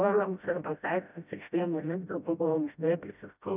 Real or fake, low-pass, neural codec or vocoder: fake; 3.6 kHz; codec, 16 kHz, 0.5 kbps, FreqCodec, smaller model